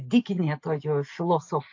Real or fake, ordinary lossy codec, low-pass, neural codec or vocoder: real; AAC, 48 kbps; 7.2 kHz; none